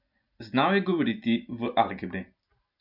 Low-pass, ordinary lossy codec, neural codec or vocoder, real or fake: 5.4 kHz; none; none; real